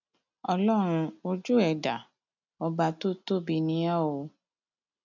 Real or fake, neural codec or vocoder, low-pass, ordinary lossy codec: real; none; 7.2 kHz; none